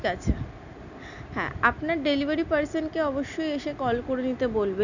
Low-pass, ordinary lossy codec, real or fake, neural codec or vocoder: 7.2 kHz; none; real; none